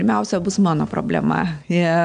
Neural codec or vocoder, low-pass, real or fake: none; 9.9 kHz; real